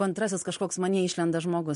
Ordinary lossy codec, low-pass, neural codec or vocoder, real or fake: MP3, 48 kbps; 10.8 kHz; none; real